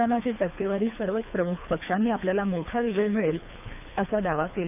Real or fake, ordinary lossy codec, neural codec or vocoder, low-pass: fake; none; codec, 24 kHz, 3 kbps, HILCodec; 3.6 kHz